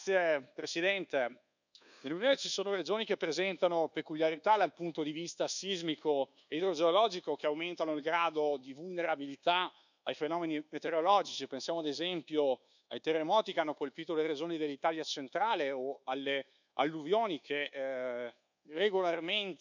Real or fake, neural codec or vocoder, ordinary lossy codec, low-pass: fake; codec, 24 kHz, 1.2 kbps, DualCodec; none; 7.2 kHz